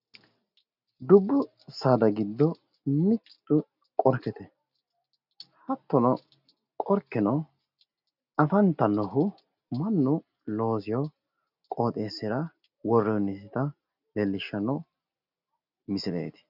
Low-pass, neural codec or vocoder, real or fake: 5.4 kHz; none; real